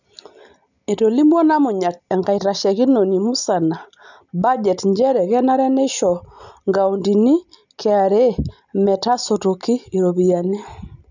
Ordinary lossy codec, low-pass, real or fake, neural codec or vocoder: none; 7.2 kHz; real; none